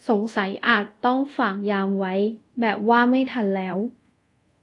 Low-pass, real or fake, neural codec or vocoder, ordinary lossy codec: 10.8 kHz; fake; codec, 24 kHz, 0.5 kbps, DualCodec; AAC, 48 kbps